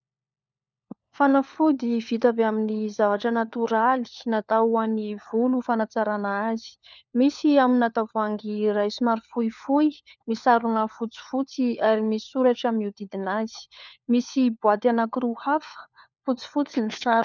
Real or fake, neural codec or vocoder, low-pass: fake; codec, 16 kHz, 4 kbps, FunCodec, trained on LibriTTS, 50 frames a second; 7.2 kHz